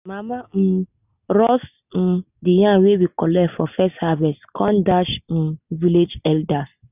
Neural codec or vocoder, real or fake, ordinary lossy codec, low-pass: none; real; none; 3.6 kHz